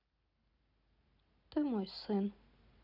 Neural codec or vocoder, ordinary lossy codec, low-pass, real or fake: none; none; 5.4 kHz; real